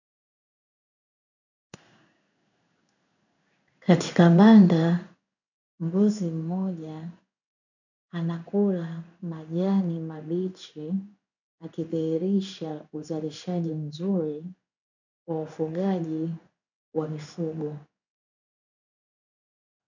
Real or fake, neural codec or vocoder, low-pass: fake; codec, 16 kHz in and 24 kHz out, 1 kbps, XY-Tokenizer; 7.2 kHz